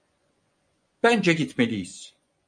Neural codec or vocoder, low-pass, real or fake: vocoder, 24 kHz, 100 mel bands, Vocos; 9.9 kHz; fake